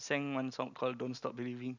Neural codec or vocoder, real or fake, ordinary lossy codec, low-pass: none; real; none; 7.2 kHz